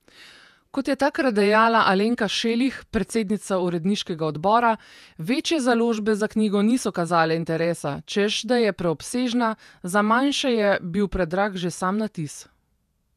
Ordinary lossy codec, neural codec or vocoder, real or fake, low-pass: none; vocoder, 48 kHz, 128 mel bands, Vocos; fake; 14.4 kHz